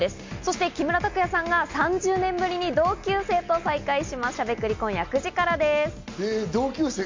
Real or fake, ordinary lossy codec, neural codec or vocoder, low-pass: real; MP3, 48 kbps; none; 7.2 kHz